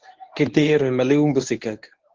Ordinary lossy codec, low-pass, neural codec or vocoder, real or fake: Opus, 16 kbps; 7.2 kHz; codec, 24 kHz, 0.9 kbps, WavTokenizer, medium speech release version 1; fake